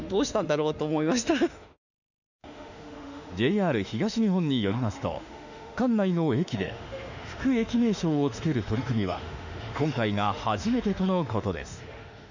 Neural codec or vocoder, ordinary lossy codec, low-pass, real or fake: autoencoder, 48 kHz, 32 numbers a frame, DAC-VAE, trained on Japanese speech; none; 7.2 kHz; fake